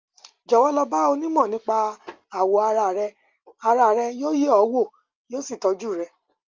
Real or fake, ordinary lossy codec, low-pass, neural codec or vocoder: real; Opus, 24 kbps; 7.2 kHz; none